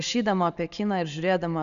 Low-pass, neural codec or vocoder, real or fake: 7.2 kHz; none; real